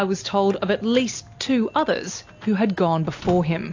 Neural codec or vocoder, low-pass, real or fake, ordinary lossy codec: none; 7.2 kHz; real; AAC, 48 kbps